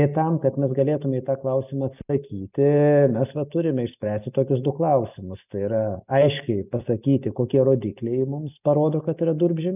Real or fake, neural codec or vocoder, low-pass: real; none; 3.6 kHz